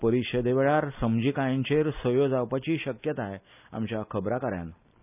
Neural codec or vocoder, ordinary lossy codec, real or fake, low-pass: none; none; real; 3.6 kHz